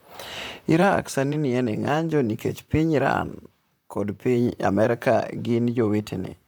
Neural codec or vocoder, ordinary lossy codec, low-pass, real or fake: vocoder, 44.1 kHz, 128 mel bands, Pupu-Vocoder; none; none; fake